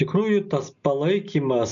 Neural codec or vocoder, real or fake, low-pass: none; real; 7.2 kHz